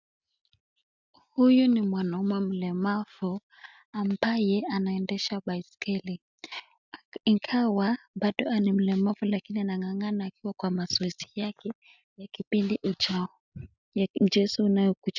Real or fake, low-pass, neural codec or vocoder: real; 7.2 kHz; none